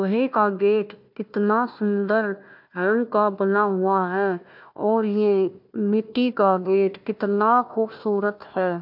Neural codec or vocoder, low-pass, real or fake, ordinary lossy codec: codec, 16 kHz, 1 kbps, FunCodec, trained on Chinese and English, 50 frames a second; 5.4 kHz; fake; MP3, 48 kbps